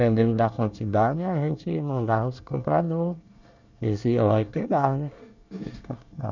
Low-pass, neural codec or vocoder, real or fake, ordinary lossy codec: 7.2 kHz; codec, 24 kHz, 1 kbps, SNAC; fake; none